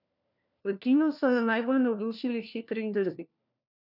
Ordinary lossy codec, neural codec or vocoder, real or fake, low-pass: none; codec, 16 kHz, 1 kbps, FunCodec, trained on LibriTTS, 50 frames a second; fake; 5.4 kHz